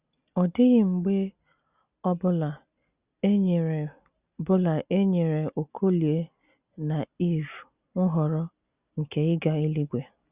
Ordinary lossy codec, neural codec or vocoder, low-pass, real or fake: Opus, 64 kbps; none; 3.6 kHz; real